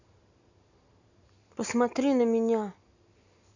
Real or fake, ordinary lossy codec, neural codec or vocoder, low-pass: real; none; none; 7.2 kHz